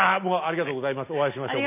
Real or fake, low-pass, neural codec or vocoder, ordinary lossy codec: real; 3.6 kHz; none; none